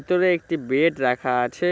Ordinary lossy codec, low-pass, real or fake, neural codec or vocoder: none; none; real; none